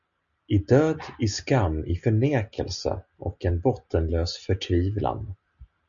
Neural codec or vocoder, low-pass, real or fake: none; 7.2 kHz; real